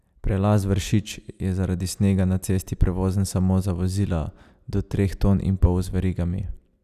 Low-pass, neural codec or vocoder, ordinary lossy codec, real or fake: 14.4 kHz; none; none; real